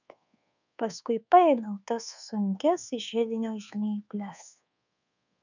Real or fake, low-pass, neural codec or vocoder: fake; 7.2 kHz; codec, 24 kHz, 1.2 kbps, DualCodec